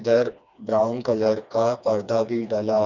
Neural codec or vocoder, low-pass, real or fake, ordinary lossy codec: codec, 16 kHz, 2 kbps, FreqCodec, smaller model; 7.2 kHz; fake; none